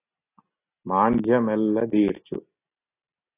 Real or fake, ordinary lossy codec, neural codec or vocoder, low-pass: real; AAC, 24 kbps; none; 3.6 kHz